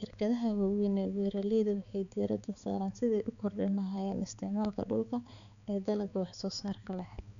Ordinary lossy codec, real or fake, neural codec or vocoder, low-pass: none; fake; codec, 16 kHz, 4 kbps, X-Codec, HuBERT features, trained on balanced general audio; 7.2 kHz